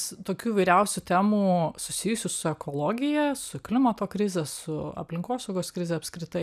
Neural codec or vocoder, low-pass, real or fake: none; 14.4 kHz; real